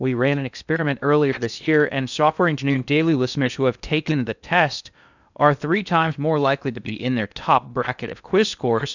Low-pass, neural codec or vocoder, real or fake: 7.2 kHz; codec, 16 kHz in and 24 kHz out, 0.6 kbps, FocalCodec, streaming, 2048 codes; fake